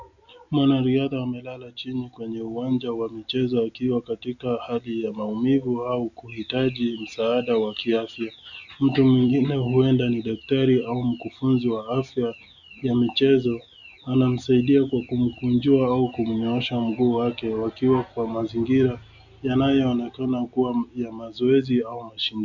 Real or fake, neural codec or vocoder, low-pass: real; none; 7.2 kHz